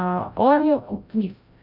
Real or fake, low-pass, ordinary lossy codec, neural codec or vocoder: fake; 5.4 kHz; none; codec, 16 kHz, 0.5 kbps, FreqCodec, larger model